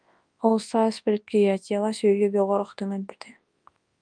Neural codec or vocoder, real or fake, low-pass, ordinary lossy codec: codec, 24 kHz, 0.9 kbps, WavTokenizer, large speech release; fake; 9.9 kHz; Opus, 32 kbps